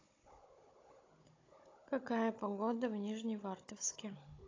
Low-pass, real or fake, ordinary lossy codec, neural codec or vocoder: 7.2 kHz; fake; AAC, 32 kbps; codec, 16 kHz, 16 kbps, FunCodec, trained on Chinese and English, 50 frames a second